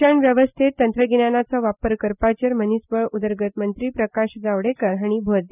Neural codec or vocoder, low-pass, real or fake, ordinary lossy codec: none; 3.6 kHz; real; none